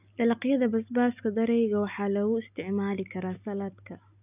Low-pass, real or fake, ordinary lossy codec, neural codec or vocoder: 3.6 kHz; real; none; none